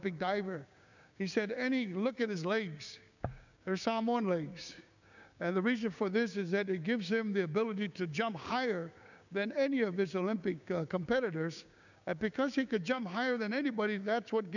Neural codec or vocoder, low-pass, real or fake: codec, 16 kHz, 6 kbps, DAC; 7.2 kHz; fake